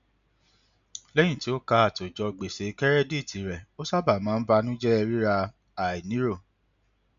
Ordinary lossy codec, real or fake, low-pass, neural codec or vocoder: MP3, 96 kbps; real; 7.2 kHz; none